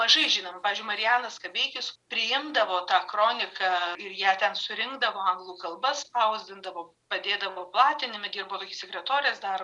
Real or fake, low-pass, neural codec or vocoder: real; 10.8 kHz; none